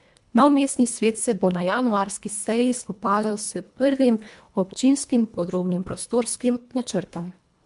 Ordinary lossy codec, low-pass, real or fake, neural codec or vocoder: MP3, 64 kbps; 10.8 kHz; fake; codec, 24 kHz, 1.5 kbps, HILCodec